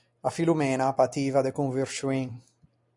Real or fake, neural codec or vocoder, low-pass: real; none; 10.8 kHz